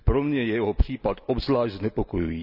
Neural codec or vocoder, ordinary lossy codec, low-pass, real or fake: none; none; 5.4 kHz; real